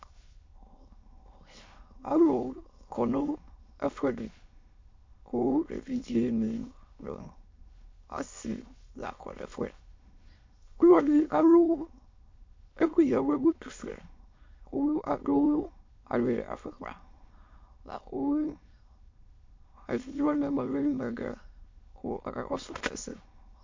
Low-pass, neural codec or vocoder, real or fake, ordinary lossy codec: 7.2 kHz; autoencoder, 22.05 kHz, a latent of 192 numbers a frame, VITS, trained on many speakers; fake; MP3, 48 kbps